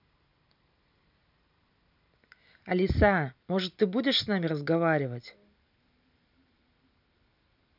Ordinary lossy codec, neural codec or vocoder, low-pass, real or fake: none; none; 5.4 kHz; real